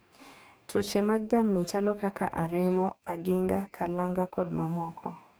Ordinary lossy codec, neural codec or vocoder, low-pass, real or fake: none; codec, 44.1 kHz, 2.6 kbps, DAC; none; fake